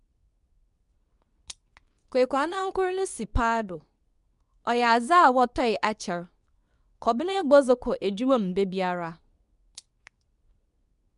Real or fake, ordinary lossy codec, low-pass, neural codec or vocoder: fake; none; 10.8 kHz; codec, 24 kHz, 0.9 kbps, WavTokenizer, medium speech release version 2